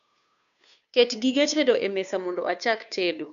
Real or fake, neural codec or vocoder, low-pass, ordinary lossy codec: fake; codec, 16 kHz, 2 kbps, FunCodec, trained on Chinese and English, 25 frames a second; 7.2 kHz; none